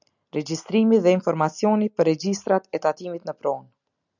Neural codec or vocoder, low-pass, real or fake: none; 7.2 kHz; real